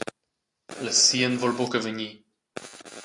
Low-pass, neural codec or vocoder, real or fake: 10.8 kHz; none; real